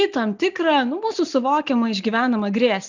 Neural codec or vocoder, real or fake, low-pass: none; real; 7.2 kHz